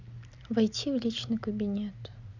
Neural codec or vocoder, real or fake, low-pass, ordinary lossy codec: none; real; 7.2 kHz; none